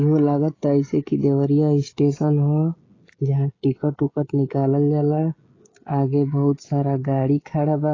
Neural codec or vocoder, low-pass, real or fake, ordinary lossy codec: codec, 16 kHz, 16 kbps, FreqCodec, smaller model; 7.2 kHz; fake; AAC, 32 kbps